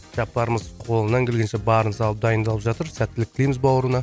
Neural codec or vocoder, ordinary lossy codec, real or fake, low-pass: none; none; real; none